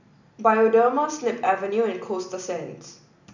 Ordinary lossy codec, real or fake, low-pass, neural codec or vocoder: none; real; 7.2 kHz; none